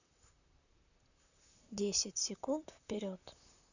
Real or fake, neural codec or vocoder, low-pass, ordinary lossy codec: fake; vocoder, 44.1 kHz, 128 mel bands, Pupu-Vocoder; 7.2 kHz; none